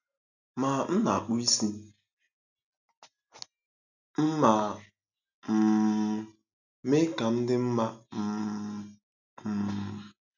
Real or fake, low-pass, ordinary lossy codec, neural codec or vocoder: real; 7.2 kHz; none; none